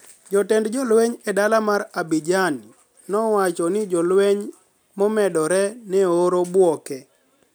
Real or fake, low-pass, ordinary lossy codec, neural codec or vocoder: real; none; none; none